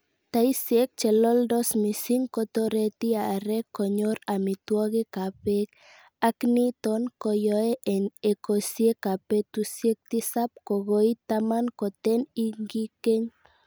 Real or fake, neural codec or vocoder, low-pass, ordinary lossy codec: real; none; none; none